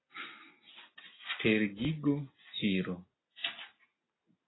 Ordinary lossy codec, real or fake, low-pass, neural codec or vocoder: AAC, 16 kbps; real; 7.2 kHz; none